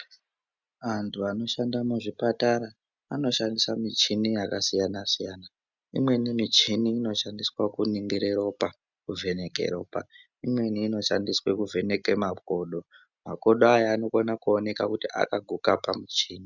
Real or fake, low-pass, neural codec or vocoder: real; 7.2 kHz; none